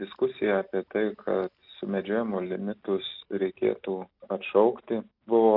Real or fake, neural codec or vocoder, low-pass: real; none; 5.4 kHz